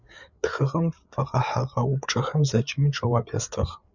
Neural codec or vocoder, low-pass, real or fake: codec, 16 kHz, 8 kbps, FreqCodec, larger model; 7.2 kHz; fake